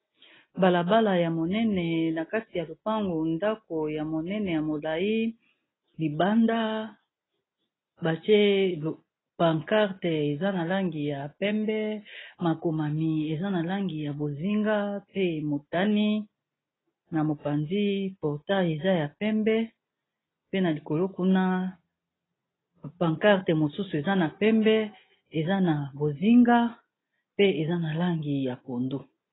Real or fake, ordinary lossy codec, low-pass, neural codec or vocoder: real; AAC, 16 kbps; 7.2 kHz; none